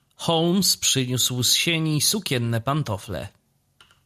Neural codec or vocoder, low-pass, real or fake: none; 14.4 kHz; real